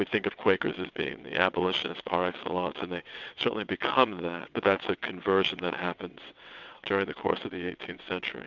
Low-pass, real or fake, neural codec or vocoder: 7.2 kHz; fake; vocoder, 22.05 kHz, 80 mel bands, WaveNeXt